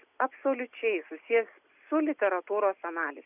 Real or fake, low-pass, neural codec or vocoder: real; 3.6 kHz; none